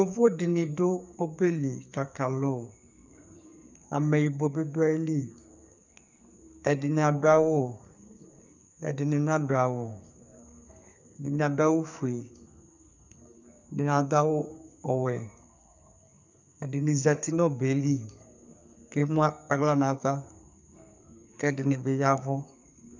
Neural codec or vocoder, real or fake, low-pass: codec, 44.1 kHz, 2.6 kbps, SNAC; fake; 7.2 kHz